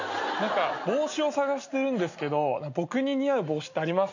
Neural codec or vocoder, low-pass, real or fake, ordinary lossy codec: none; 7.2 kHz; real; none